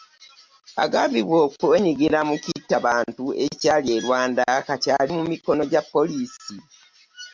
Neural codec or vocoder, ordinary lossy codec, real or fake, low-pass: none; MP3, 64 kbps; real; 7.2 kHz